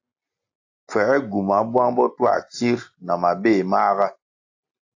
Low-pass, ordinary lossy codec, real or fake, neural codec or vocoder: 7.2 kHz; AAC, 48 kbps; real; none